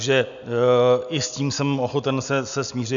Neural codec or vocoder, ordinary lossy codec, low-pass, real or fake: none; MP3, 96 kbps; 7.2 kHz; real